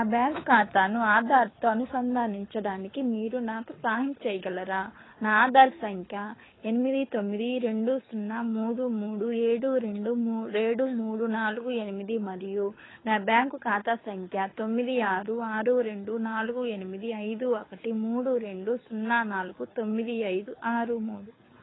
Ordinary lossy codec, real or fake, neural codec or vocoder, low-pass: AAC, 16 kbps; fake; codec, 24 kHz, 6 kbps, HILCodec; 7.2 kHz